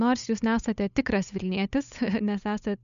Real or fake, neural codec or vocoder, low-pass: fake; codec, 16 kHz, 8 kbps, FunCodec, trained on Chinese and English, 25 frames a second; 7.2 kHz